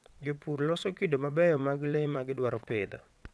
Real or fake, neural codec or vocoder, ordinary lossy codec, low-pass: fake; vocoder, 22.05 kHz, 80 mel bands, WaveNeXt; none; none